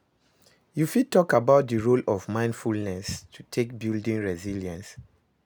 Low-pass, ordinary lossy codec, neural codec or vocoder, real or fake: none; none; none; real